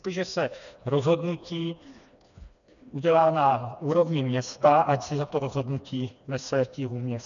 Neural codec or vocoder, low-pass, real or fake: codec, 16 kHz, 2 kbps, FreqCodec, smaller model; 7.2 kHz; fake